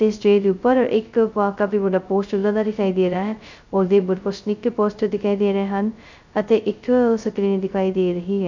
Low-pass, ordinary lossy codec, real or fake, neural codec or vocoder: 7.2 kHz; none; fake; codec, 16 kHz, 0.2 kbps, FocalCodec